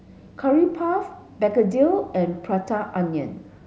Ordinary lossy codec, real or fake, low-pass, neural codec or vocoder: none; real; none; none